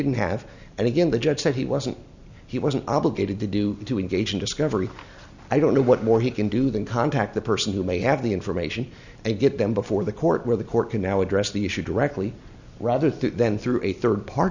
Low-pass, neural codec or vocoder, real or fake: 7.2 kHz; none; real